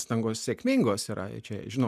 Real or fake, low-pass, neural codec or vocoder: real; 14.4 kHz; none